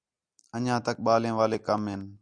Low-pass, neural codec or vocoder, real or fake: 9.9 kHz; none; real